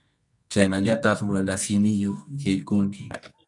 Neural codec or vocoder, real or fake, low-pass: codec, 24 kHz, 0.9 kbps, WavTokenizer, medium music audio release; fake; 10.8 kHz